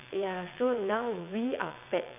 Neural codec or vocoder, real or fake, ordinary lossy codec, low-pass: vocoder, 22.05 kHz, 80 mel bands, WaveNeXt; fake; none; 3.6 kHz